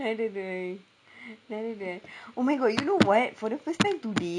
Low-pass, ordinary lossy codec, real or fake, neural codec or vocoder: 9.9 kHz; none; real; none